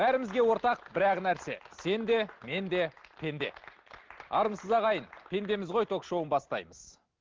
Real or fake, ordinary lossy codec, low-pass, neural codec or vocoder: real; Opus, 16 kbps; 7.2 kHz; none